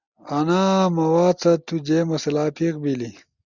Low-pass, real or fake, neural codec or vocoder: 7.2 kHz; real; none